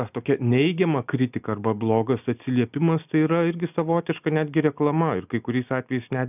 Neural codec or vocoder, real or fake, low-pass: none; real; 3.6 kHz